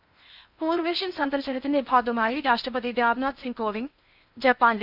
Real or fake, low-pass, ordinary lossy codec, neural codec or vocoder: fake; 5.4 kHz; none; codec, 16 kHz in and 24 kHz out, 0.8 kbps, FocalCodec, streaming, 65536 codes